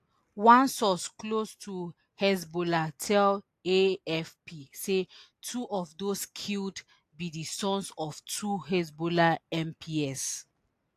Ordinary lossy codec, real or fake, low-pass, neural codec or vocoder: AAC, 64 kbps; real; 14.4 kHz; none